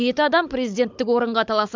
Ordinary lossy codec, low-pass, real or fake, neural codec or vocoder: MP3, 64 kbps; 7.2 kHz; fake; codec, 16 kHz, 16 kbps, FunCodec, trained on Chinese and English, 50 frames a second